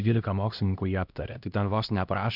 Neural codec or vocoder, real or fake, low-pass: codec, 16 kHz, 1 kbps, X-Codec, HuBERT features, trained on LibriSpeech; fake; 5.4 kHz